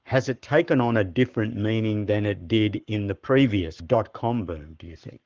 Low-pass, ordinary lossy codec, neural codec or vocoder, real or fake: 7.2 kHz; Opus, 32 kbps; codec, 44.1 kHz, 7.8 kbps, Pupu-Codec; fake